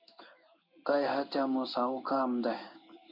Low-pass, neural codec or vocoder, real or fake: 5.4 kHz; codec, 16 kHz in and 24 kHz out, 1 kbps, XY-Tokenizer; fake